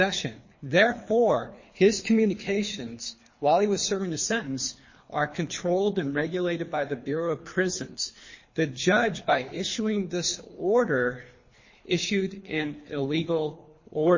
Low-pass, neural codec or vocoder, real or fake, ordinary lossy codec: 7.2 kHz; codec, 24 kHz, 3 kbps, HILCodec; fake; MP3, 32 kbps